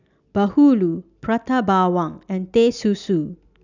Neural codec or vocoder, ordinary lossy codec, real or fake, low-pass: none; none; real; 7.2 kHz